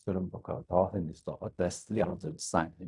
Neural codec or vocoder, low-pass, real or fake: codec, 16 kHz in and 24 kHz out, 0.4 kbps, LongCat-Audio-Codec, fine tuned four codebook decoder; 10.8 kHz; fake